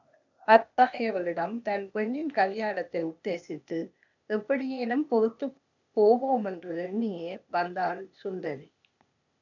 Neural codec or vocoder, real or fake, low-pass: codec, 16 kHz, 0.8 kbps, ZipCodec; fake; 7.2 kHz